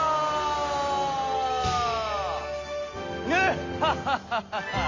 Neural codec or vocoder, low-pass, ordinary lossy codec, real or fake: none; 7.2 kHz; AAC, 48 kbps; real